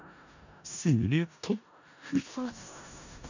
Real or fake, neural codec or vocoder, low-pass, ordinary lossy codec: fake; codec, 16 kHz in and 24 kHz out, 0.4 kbps, LongCat-Audio-Codec, four codebook decoder; 7.2 kHz; none